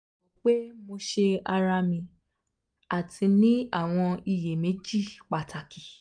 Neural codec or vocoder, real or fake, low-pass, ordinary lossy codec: none; real; 9.9 kHz; none